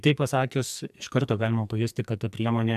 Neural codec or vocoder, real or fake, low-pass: codec, 44.1 kHz, 2.6 kbps, SNAC; fake; 14.4 kHz